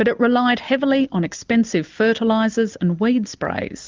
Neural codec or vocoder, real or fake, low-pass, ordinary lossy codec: none; real; 7.2 kHz; Opus, 24 kbps